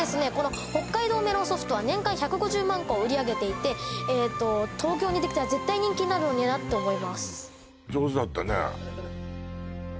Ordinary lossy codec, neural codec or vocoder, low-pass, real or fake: none; none; none; real